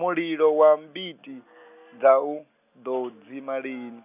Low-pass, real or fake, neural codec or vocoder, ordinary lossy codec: 3.6 kHz; real; none; none